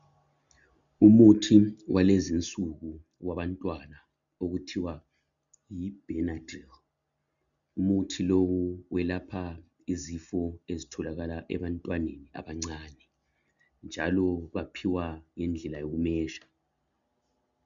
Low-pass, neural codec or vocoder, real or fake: 7.2 kHz; none; real